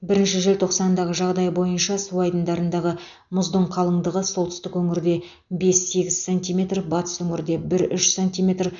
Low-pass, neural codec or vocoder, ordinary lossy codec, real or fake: 7.2 kHz; none; none; real